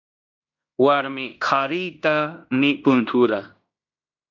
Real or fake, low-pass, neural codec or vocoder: fake; 7.2 kHz; codec, 16 kHz in and 24 kHz out, 0.9 kbps, LongCat-Audio-Codec, fine tuned four codebook decoder